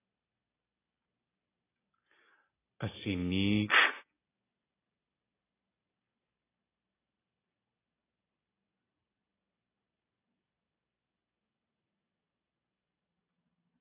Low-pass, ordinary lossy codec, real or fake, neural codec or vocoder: 3.6 kHz; AAC, 16 kbps; real; none